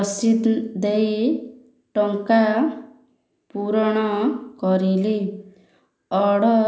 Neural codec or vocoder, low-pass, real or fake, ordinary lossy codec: none; none; real; none